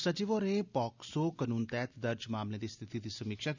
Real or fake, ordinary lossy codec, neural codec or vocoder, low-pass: real; none; none; 7.2 kHz